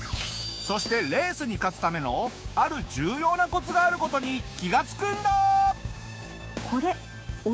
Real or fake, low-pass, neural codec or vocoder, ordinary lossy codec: fake; none; codec, 16 kHz, 6 kbps, DAC; none